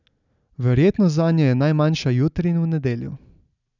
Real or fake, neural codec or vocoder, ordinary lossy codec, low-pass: real; none; none; 7.2 kHz